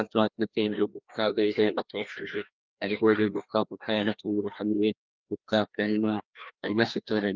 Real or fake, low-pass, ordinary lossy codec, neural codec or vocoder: fake; 7.2 kHz; Opus, 24 kbps; codec, 16 kHz, 1 kbps, FreqCodec, larger model